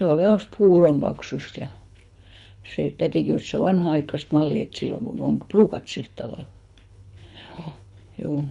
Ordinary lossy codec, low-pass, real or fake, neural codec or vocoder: none; 10.8 kHz; fake; codec, 24 kHz, 3 kbps, HILCodec